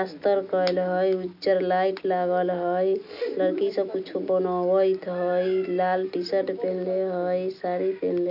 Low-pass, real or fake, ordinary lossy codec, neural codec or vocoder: 5.4 kHz; real; none; none